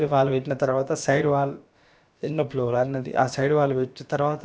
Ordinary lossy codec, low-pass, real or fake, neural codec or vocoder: none; none; fake; codec, 16 kHz, about 1 kbps, DyCAST, with the encoder's durations